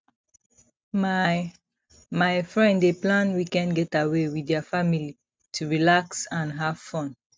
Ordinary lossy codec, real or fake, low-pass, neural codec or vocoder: none; real; none; none